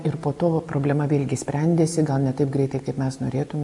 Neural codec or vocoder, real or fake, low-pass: none; real; 10.8 kHz